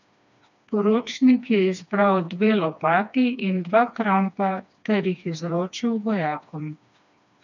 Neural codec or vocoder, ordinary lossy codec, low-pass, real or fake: codec, 16 kHz, 2 kbps, FreqCodec, smaller model; none; 7.2 kHz; fake